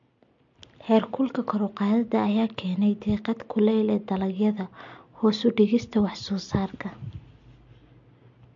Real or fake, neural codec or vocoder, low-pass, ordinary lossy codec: real; none; 7.2 kHz; MP3, 48 kbps